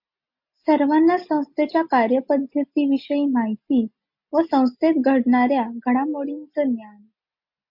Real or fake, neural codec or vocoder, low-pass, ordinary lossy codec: real; none; 5.4 kHz; MP3, 48 kbps